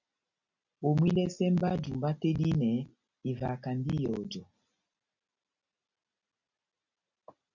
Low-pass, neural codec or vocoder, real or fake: 7.2 kHz; none; real